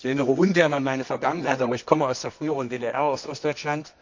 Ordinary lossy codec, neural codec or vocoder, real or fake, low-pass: MP3, 48 kbps; codec, 24 kHz, 0.9 kbps, WavTokenizer, medium music audio release; fake; 7.2 kHz